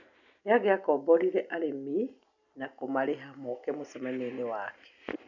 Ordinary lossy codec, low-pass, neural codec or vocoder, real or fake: none; 7.2 kHz; none; real